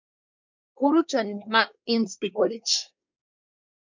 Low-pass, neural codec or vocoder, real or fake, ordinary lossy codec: 7.2 kHz; codec, 24 kHz, 1 kbps, SNAC; fake; MP3, 64 kbps